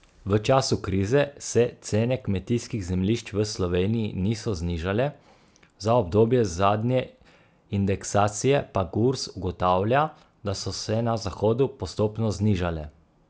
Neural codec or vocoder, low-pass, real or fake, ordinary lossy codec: none; none; real; none